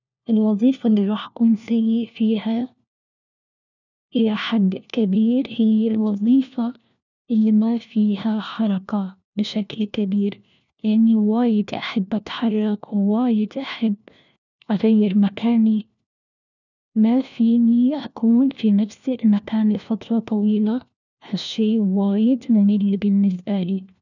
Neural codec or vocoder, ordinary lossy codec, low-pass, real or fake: codec, 16 kHz, 1 kbps, FunCodec, trained on LibriTTS, 50 frames a second; none; 7.2 kHz; fake